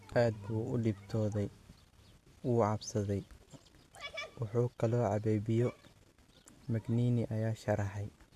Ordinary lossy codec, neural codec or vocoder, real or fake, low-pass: AAC, 64 kbps; none; real; 14.4 kHz